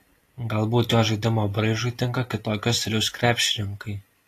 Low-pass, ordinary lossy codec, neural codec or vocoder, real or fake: 14.4 kHz; AAC, 48 kbps; none; real